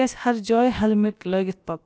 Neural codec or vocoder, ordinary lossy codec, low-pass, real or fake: codec, 16 kHz, about 1 kbps, DyCAST, with the encoder's durations; none; none; fake